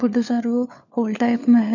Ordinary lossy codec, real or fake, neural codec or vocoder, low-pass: none; fake; codec, 16 kHz, 4 kbps, FunCodec, trained on LibriTTS, 50 frames a second; 7.2 kHz